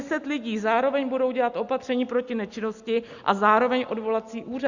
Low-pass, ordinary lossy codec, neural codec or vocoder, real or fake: 7.2 kHz; Opus, 64 kbps; none; real